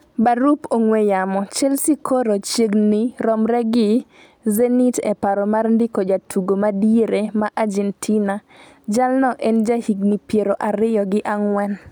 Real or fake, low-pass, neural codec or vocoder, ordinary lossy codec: real; 19.8 kHz; none; none